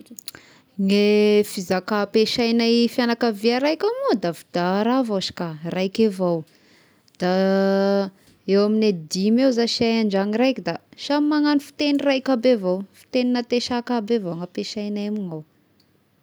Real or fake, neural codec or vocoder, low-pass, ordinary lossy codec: real; none; none; none